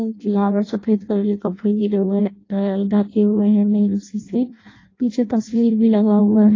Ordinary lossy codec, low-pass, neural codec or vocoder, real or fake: AAC, 32 kbps; 7.2 kHz; codec, 16 kHz in and 24 kHz out, 0.6 kbps, FireRedTTS-2 codec; fake